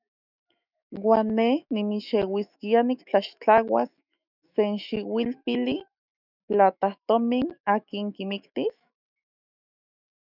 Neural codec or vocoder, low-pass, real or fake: autoencoder, 48 kHz, 128 numbers a frame, DAC-VAE, trained on Japanese speech; 5.4 kHz; fake